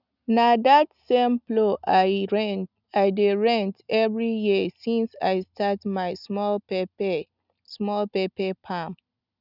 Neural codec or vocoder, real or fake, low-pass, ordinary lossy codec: none; real; 5.4 kHz; none